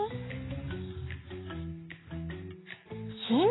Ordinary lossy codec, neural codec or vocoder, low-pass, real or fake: AAC, 16 kbps; none; 7.2 kHz; real